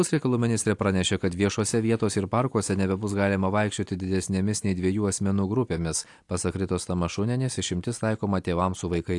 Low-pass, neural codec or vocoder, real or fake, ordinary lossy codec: 10.8 kHz; none; real; AAC, 64 kbps